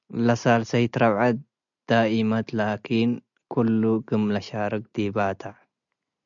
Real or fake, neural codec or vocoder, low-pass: real; none; 7.2 kHz